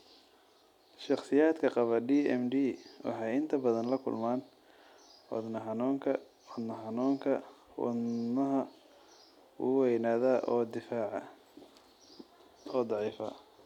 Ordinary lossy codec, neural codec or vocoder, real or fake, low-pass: none; none; real; 19.8 kHz